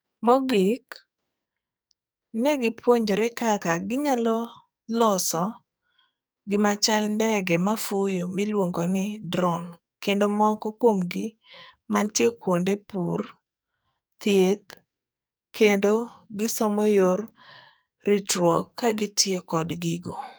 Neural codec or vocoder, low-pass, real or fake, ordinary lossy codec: codec, 44.1 kHz, 2.6 kbps, SNAC; none; fake; none